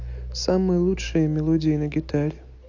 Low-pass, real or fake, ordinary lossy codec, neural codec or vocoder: 7.2 kHz; real; AAC, 48 kbps; none